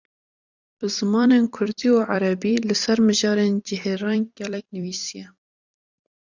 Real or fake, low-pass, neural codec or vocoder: fake; 7.2 kHz; vocoder, 44.1 kHz, 128 mel bands every 256 samples, BigVGAN v2